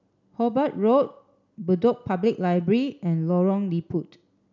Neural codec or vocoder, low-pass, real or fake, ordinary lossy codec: none; 7.2 kHz; real; none